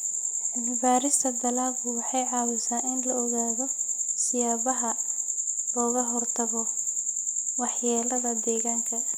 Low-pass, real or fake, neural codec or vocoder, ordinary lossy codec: none; real; none; none